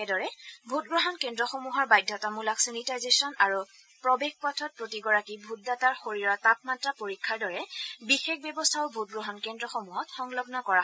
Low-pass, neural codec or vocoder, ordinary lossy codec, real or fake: none; none; none; real